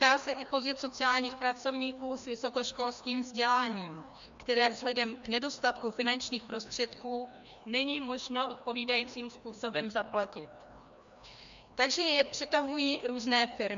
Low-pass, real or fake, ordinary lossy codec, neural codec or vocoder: 7.2 kHz; fake; MP3, 64 kbps; codec, 16 kHz, 1 kbps, FreqCodec, larger model